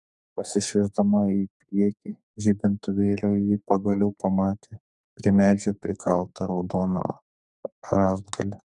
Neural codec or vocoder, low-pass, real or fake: codec, 44.1 kHz, 2.6 kbps, SNAC; 10.8 kHz; fake